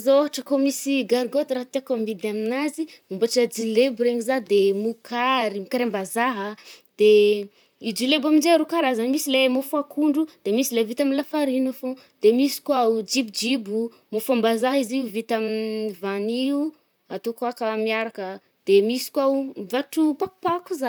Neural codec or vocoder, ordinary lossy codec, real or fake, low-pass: vocoder, 44.1 kHz, 128 mel bands, Pupu-Vocoder; none; fake; none